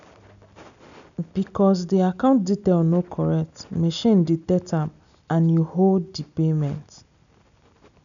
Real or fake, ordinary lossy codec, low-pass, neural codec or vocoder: real; none; 7.2 kHz; none